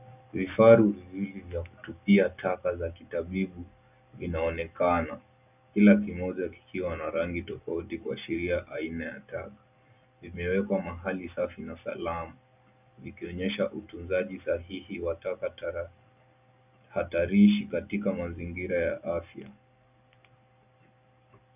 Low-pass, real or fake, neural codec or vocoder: 3.6 kHz; real; none